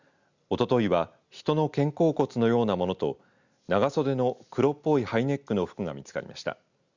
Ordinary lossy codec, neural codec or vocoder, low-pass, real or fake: Opus, 64 kbps; none; 7.2 kHz; real